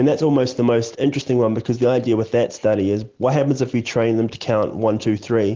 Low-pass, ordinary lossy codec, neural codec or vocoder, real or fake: 7.2 kHz; Opus, 16 kbps; none; real